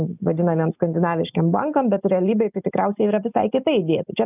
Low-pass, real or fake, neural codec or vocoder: 3.6 kHz; real; none